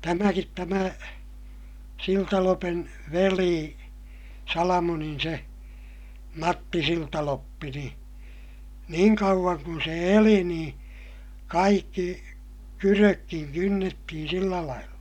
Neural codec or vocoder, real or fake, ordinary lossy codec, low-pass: none; real; none; 19.8 kHz